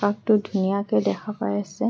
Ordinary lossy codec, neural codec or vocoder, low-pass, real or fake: none; none; none; real